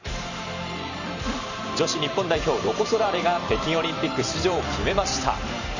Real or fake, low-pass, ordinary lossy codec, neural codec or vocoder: fake; 7.2 kHz; none; vocoder, 44.1 kHz, 128 mel bands every 512 samples, BigVGAN v2